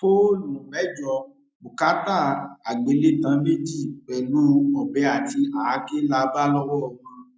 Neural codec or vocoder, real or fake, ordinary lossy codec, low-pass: none; real; none; none